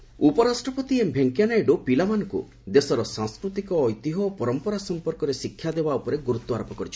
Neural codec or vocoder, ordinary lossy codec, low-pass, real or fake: none; none; none; real